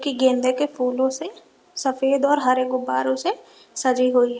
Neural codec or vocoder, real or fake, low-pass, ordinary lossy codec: none; real; none; none